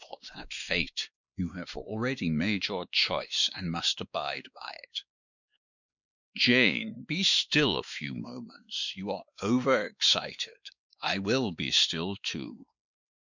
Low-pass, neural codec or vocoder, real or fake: 7.2 kHz; codec, 16 kHz, 2 kbps, X-Codec, WavLM features, trained on Multilingual LibriSpeech; fake